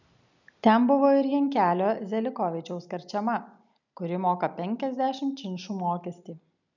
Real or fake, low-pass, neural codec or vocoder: real; 7.2 kHz; none